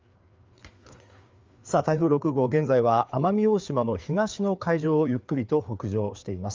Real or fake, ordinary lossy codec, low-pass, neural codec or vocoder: fake; Opus, 32 kbps; 7.2 kHz; codec, 16 kHz, 4 kbps, FreqCodec, larger model